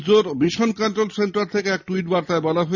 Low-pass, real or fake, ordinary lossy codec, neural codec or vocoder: 7.2 kHz; real; none; none